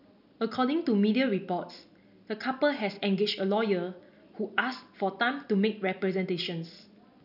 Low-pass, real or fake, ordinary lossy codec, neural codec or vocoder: 5.4 kHz; real; none; none